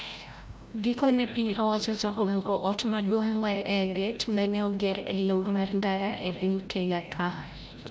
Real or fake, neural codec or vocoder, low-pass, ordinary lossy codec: fake; codec, 16 kHz, 0.5 kbps, FreqCodec, larger model; none; none